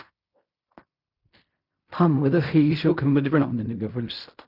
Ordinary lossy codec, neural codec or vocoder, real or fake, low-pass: Opus, 64 kbps; codec, 16 kHz in and 24 kHz out, 0.4 kbps, LongCat-Audio-Codec, fine tuned four codebook decoder; fake; 5.4 kHz